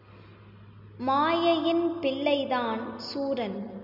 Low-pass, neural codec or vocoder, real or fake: 5.4 kHz; none; real